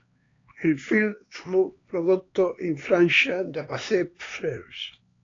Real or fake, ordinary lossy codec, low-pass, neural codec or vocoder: fake; AAC, 32 kbps; 7.2 kHz; codec, 16 kHz, 2 kbps, X-Codec, HuBERT features, trained on LibriSpeech